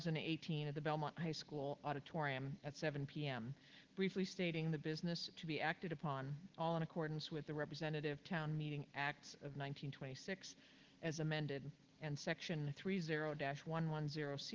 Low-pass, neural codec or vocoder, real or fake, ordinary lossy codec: 7.2 kHz; none; real; Opus, 16 kbps